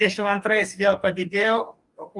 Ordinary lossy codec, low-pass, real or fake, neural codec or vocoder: Opus, 32 kbps; 10.8 kHz; fake; codec, 44.1 kHz, 2.6 kbps, DAC